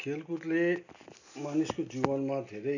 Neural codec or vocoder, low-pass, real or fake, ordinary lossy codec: none; 7.2 kHz; real; none